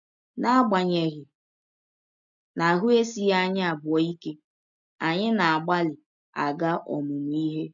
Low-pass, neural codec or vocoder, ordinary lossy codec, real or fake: 7.2 kHz; none; none; real